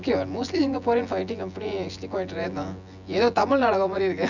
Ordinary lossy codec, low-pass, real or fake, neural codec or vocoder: none; 7.2 kHz; fake; vocoder, 24 kHz, 100 mel bands, Vocos